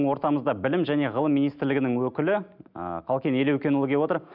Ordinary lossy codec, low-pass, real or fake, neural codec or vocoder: none; 5.4 kHz; real; none